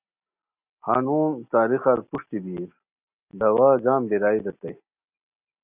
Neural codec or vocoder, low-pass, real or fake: none; 3.6 kHz; real